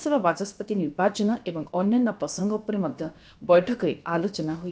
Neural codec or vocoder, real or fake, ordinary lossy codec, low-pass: codec, 16 kHz, about 1 kbps, DyCAST, with the encoder's durations; fake; none; none